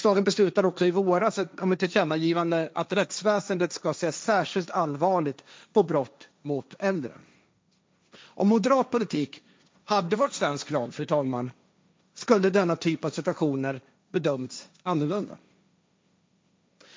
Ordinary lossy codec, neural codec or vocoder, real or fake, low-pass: none; codec, 16 kHz, 1.1 kbps, Voila-Tokenizer; fake; none